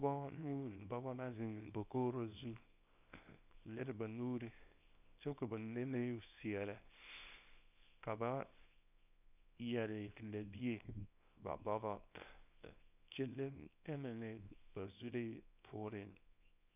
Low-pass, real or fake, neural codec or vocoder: 3.6 kHz; fake; codec, 24 kHz, 0.9 kbps, WavTokenizer, small release